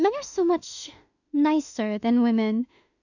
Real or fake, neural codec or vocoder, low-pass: fake; autoencoder, 48 kHz, 32 numbers a frame, DAC-VAE, trained on Japanese speech; 7.2 kHz